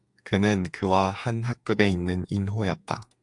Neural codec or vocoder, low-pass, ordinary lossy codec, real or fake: codec, 32 kHz, 1.9 kbps, SNAC; 10.8 kHz; AAC, 64 kbps; fake